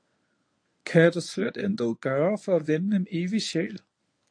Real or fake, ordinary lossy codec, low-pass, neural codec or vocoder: fake; AAC, 48 kbps; 9.9 kHz; codec, 24 kHz, 0.9 kbps, WavTokenizer, medium speech release version 1